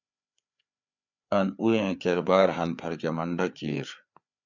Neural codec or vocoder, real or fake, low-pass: codec, 16 kHz, 4 kbps, FreqCodec, larger model; fake; 7.2 kHz